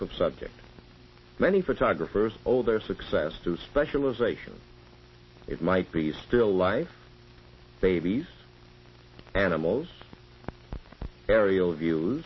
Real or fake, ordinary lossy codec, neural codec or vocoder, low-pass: real; MP3, 24 kbps; none; 7.2 kHz